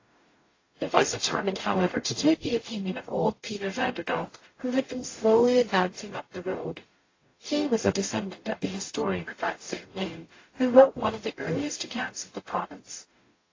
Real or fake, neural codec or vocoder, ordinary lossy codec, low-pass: fake; codec, 44.1 kHz, 0.9 kbps, DAC; AAC, 32 kbps; 7.2 kHz